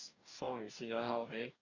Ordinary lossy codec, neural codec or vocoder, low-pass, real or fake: none; codec, 44.1 kHz, 2.6 kbps, DAC; 7.2 kHz; fake